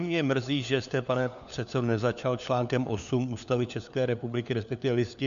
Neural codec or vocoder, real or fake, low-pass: codec, 16 kHz, 4 kbps, FunCodec, trained on LibriTTS, 50 frames a second; fake; 7.2 kHz